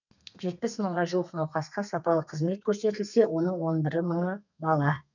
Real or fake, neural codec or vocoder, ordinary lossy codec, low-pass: fake; codec, 32 kHz, 1.9 kbps, SNAC; none; 7.2 kHz